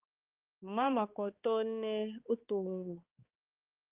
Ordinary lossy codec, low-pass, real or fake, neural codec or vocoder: Opus, 32 kbps; 3.6 kHz; fake; codec, 16 kHz, 2 kbps, X-Codec, HuBERT features, trained on balanced general audio